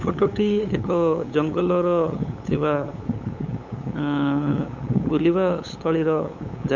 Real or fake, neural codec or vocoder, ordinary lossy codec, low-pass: fake; codec, 16 kHz, 8 kbps, FunCodec, trained on LibriTTS, 25 frames a second; none; 7.2 kHz